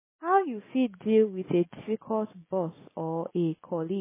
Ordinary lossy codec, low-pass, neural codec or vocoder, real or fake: MP3, 16 kbps; 3.6 kHz; none; real